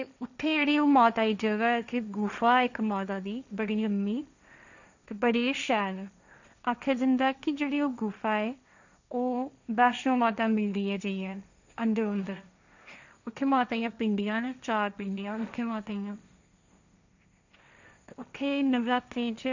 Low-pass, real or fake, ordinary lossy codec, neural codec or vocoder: 7.2 kHz; fake; none; codec, 16 kHz, 1.1 kbps, Voila-Tokenizer